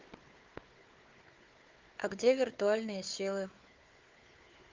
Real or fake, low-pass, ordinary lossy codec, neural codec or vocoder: fake; 7.2 kHz; Opus, 32 kbps; codec, 16 kHz, 4 kbps, FunCodec, trained on Chinese and English, 50 frames a second